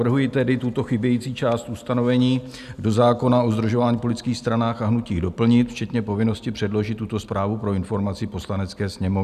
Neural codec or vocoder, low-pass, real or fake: none; 14.4 kHz; real